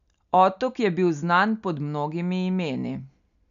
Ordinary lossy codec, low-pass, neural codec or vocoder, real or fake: none; 7.2 kHz; none; real